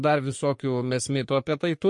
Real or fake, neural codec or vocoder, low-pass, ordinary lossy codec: fake; codec, 44.1 kHz, 3.4 kbps, Pupu-Codec; 10.8 kHz; MP3, 48 kbps